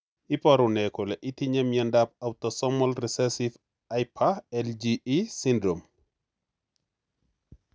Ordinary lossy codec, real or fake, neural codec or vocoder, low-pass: none; real; none; none